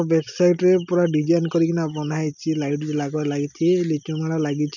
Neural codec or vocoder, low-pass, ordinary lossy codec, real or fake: none; 7.2 kHz; none; real